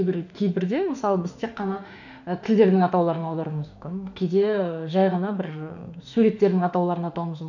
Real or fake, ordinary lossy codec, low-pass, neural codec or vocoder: fake; none; 7.2 kHz; autoencoder, 48 kHz, 32 numbers a frame, DAC-VAE, trained on Japanese speech